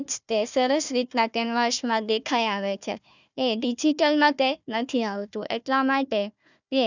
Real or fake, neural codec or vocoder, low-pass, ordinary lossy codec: fake; codec, 16 kHz, 1 kbps, FunCodec, trained on Chinese and English, 50 frames a second; 7.2 kHz; none